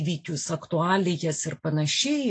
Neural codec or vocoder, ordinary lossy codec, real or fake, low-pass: none; AAC, 48 kbps; real; 9.9 kHz